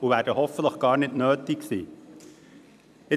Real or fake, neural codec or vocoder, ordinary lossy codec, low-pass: fake; vocoder, 44.1 kHz, 128 mel bands every 256 samples, BigVGAN v2; none; 14.4 kHz